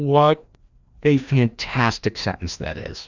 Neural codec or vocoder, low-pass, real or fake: codec, 16 kHz, 1 kbps, FreqCodec, larger model; 7.2 kHz; fake